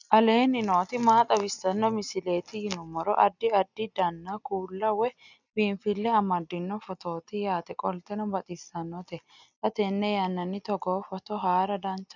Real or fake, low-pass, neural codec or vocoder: real; 7.2 kHz; none